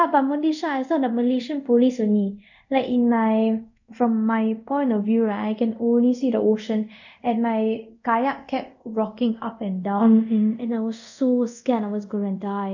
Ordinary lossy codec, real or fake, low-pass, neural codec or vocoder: none; fake; 7.2 kHz; codec, 24 kHz, 0.5 kbps, DualCodec